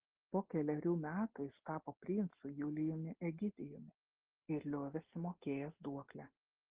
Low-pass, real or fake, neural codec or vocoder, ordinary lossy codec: 3.6 kHz; real; none; Opus, 16 kbps